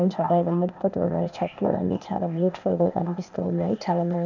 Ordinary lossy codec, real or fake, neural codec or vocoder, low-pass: none; fake; codec, 16 kHz, 0.8 kbps, ZipCodec; 7.2 kHz